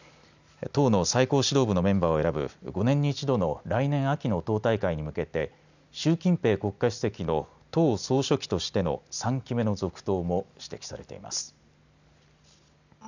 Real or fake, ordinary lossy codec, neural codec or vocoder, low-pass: real; none; none; 7.2 kHz